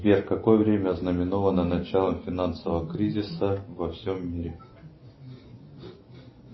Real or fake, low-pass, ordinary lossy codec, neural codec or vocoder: real; 7.2 kHz; MP3, 24 kbps; none